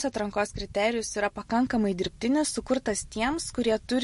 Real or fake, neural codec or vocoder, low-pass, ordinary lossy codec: real; none; 14.4 kHz; MP3, 48 kbps